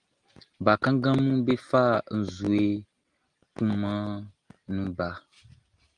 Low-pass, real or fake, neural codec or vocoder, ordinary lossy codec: 9.9 kHz; real; none; Opus, 24 kbps